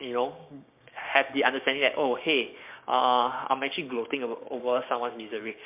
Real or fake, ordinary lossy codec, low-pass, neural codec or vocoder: fake; MP3, 32 kbps; 3.6 kHz; codec, 16 kHz, 6 kbps, DAC